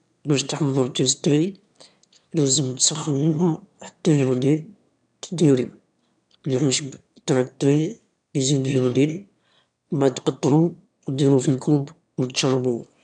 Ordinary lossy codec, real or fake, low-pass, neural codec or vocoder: none; fake; 9.9 kHz; autoencoder, 22.05 kHz, a latent of 192 numbers a frame, VITS, trained on one speaker